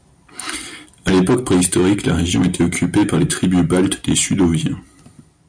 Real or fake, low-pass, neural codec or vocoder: real; 9.9 kHz; none